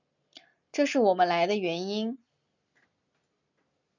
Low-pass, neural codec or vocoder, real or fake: 7.2 kHz; none; real